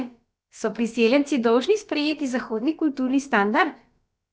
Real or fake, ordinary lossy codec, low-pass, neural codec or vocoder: fake; none; none; codec, 16 kHz, about 1 kbps, DyCAST, with the encoder's durations